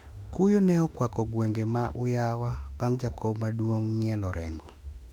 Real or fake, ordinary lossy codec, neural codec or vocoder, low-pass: fake; MP3, 96 kbps; autoencoder, 48 kHz, 32 numbers a frame, DAC-VAE, trained on Japanese speech; 19.8 kHz